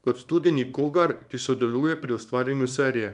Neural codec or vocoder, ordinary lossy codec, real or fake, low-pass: codec, 24 kHz, 0.9 kbps, WavTokenizer, small release; none; fake; 10.8 kHz